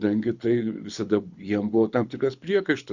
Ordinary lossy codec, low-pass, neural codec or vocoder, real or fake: Opus, 64 kbps; 7.2 kHz; codec, 24 kHz, 6 kbps, HILCodec; fake